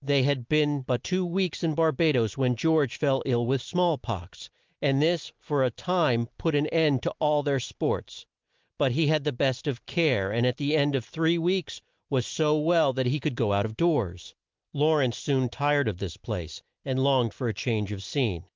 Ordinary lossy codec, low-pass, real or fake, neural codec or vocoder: Opus, 32 kbps; 7.2 kHz; real; none